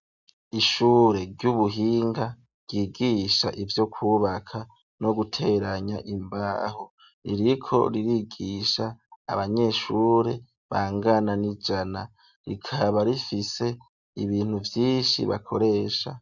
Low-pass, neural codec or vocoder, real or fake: 7.2 kHz; none; real